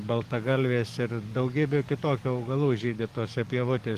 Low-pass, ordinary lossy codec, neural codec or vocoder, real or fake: 14.4 kHz; Opus, 24 kbps; codec, 44.1 kHz, 7.8 kbps, DAC; fake